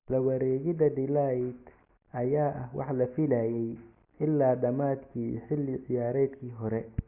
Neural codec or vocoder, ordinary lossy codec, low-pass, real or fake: none; Opus, 64 kbps; 3.6 kHz; real